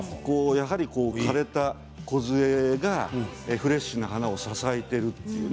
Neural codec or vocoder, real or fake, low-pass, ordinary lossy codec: none; real; none; none